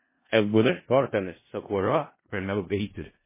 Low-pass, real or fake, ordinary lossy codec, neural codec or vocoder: 3.6 kHz; fake; MP3, 16 kbps; codec, 16 kHz in and 24 kHz out, 0.4 kbps, LongCat-Audio-Codec, four codebook decoder